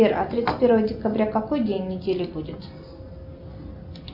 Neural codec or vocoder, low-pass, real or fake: none; 5.4 kHz; real